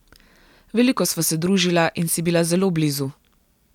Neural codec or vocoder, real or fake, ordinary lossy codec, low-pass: none; real; none; 19.8 kHz